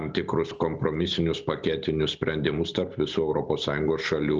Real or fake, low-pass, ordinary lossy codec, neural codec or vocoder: real; 7.2 kHz; Opus, 24 kbps; none